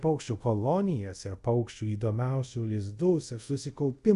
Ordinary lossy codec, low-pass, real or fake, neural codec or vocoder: Opus, 64 kbps; 10.8 kHz; fake; codec, 24 kHz, 0.5 kbps, DualCodec